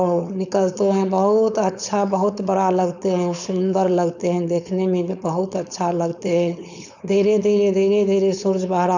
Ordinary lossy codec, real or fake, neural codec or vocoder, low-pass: none; fake; codec, 16 kHz, 4.8 kbps, FACodec; 7.2 kHz